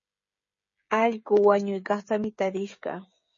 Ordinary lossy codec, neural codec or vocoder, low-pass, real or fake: MP3, 32 kbps; codec, 16 kHz, 16 kbps, FreqCodec, smaller model; 7.2 kHz; fake